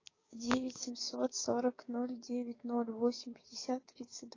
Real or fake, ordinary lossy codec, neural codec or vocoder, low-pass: fake; AAC, 32 kbps; codec, 16 kHz, 6 kbps, DAC; 7.2 kHz